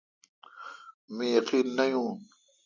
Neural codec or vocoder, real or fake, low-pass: none; real; 7.2 kHz